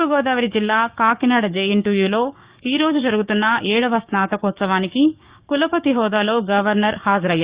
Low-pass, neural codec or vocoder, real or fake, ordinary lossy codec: 3.6 kHz; codec, 16 kHz, 6 kbps, DAC; fake; Opus, 64 kbps